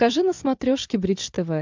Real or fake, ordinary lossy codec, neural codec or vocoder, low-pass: real; MP3, 64 kbps; none; 7.2 kHz